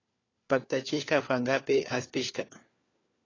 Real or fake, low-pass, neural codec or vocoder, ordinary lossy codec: fake; 7.2 kHz; codec, 16 kHz, 4 kbps, FunCodec, trained on LibriTTS, 50 frames a second; AAC, 32 kbps